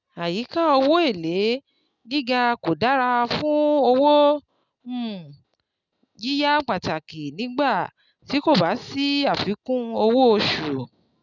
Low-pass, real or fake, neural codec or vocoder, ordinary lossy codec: 7.2 kHz; real; none; none